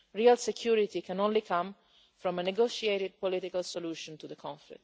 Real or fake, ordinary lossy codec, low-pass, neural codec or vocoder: real; none; none; none